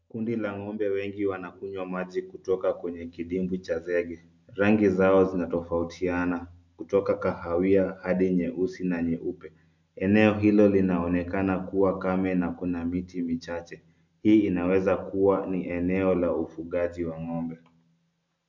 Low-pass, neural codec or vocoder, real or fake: 7.2 kHz; none; real